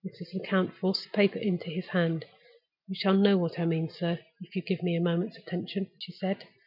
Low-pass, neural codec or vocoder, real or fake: 5.4 kHz; none; real